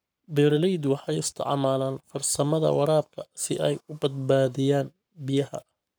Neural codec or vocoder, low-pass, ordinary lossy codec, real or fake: codec, 44.1 kHz, 7.8 kbps, Pupu-Codec; none; none; fake